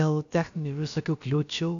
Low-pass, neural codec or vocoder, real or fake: 7.2 kHz; codec, 16 kHz, about 1 kbps, DyCAST, with the encoder's durations; fake